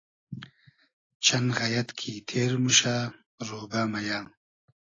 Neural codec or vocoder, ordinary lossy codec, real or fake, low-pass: none; AAC, 32 kbps; real; 7.2 kHz